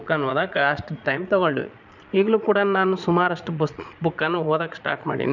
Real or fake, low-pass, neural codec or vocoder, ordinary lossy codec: fake; 7.2 kHz; vocoder, 22.05 kHz, 80 mel bands, Vocos; none